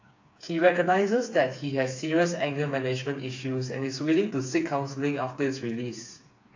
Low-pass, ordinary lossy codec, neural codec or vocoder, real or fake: 7.2 kHz; AAC, 48 kbps; codec, 16 kHz, 4 kbps, FreqCodec, smaller model; fake